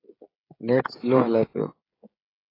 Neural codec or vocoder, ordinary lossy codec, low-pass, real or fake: codec, 16 kHz, 16 kbps, FreqCodec, smaller model; AAC, 24 kbps; 5.4 kHz; fake